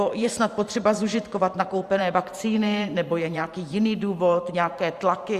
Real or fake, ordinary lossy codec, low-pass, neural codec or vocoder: fake; Opus, 64 kbps; 14.4 kHz; vocoder, 44.1 kHz, 128 mel bands every 512 samples, BigVGAN v2